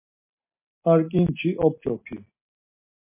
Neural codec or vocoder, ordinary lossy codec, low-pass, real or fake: none; MP3, 24 kbps; 3.6 kHz; real